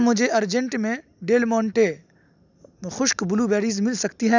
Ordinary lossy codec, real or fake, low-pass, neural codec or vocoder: none; real; 7.2 kHz; none